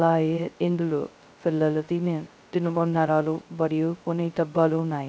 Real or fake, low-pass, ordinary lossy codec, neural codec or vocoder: fake; none; none; codec, 16 kHz, 0.2 kbps, FocalCodec